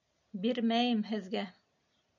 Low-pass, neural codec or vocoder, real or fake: 7.2 kHz; none; real